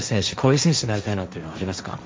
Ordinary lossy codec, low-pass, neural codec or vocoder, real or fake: none; none; codec, 16 kHz, 1.1 kbps, Voila-Tokenizer; fake